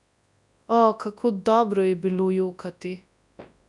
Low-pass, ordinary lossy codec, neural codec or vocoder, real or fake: 10.8 kHz; none; codec, 24 kHz, 0.9 kbps, WavTokenizer, large speech release; fake